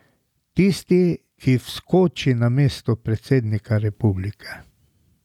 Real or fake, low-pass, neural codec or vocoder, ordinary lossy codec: real; 19.8 kHz; none; none